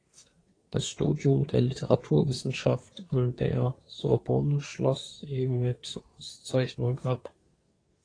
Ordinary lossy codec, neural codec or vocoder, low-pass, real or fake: AAC, 32 kbps; codec, 24 kHz, 1 kbps, SNAC; 9.9 kHz; fake